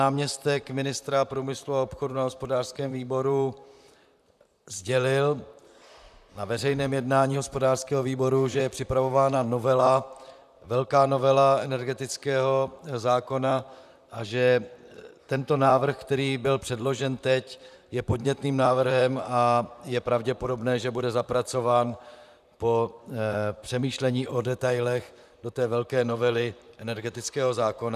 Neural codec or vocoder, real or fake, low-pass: vocoder, 44.1 kHz, 128 mel bands, Pupu-Vocoder; fake; 14.4 kHz